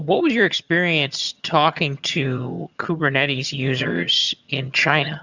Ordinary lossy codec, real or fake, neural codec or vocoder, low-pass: Opus, 64 kbps; fake; vocoder, 22.05 kHz, 80 mel bands, HiFi-GAN; 7.2 kHz